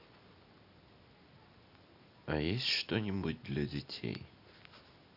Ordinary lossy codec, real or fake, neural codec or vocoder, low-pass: none; real; none; 5.4 kHz